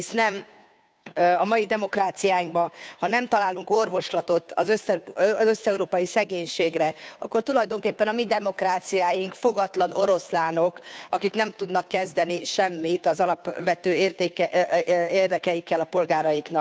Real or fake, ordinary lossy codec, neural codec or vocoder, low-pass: fake; none; codec, 16 kHz, 2 kbps, FunCodec, trained on Chinese and English, 25 frames a second; none